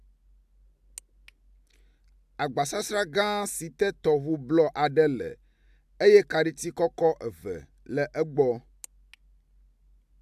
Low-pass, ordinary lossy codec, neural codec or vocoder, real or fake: 14.4 kHz; AAC, 96 kbps; none; real